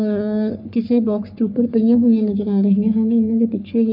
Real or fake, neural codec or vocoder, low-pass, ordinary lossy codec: fake; codec, 44.1 kHz, 3.4 kbps, Pupu-Codec; 5.4 kHz; none